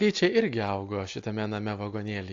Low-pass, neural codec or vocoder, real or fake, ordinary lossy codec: 7.2 kHz; none; real; AAC, 48 kbps